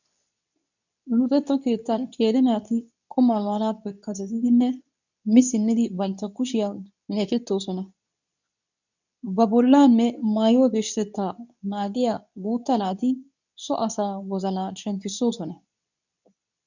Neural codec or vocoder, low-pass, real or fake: codec, 24 kHz, 0.9 kbps, WavTokenizer, medium speech release version 2; 7.2 kHz; fake